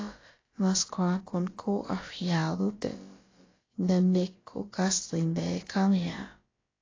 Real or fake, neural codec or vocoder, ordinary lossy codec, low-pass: fake; codec, 16 kHz, about 1 kbps, DyCAST, with the encoder's durations; AAC, 32 kbps; 7.2 kHz